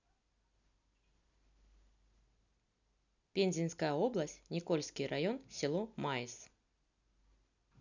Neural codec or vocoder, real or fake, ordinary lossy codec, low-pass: none; real; none; 7.2 kHz